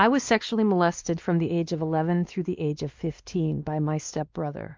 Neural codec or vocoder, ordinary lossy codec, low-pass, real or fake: codec, 16 kHz, 2 kbps, X-Codec, WavLM features, trained on Multilingual LibriSpeech; Opus, 32 kbps; 7.2 kHz; fake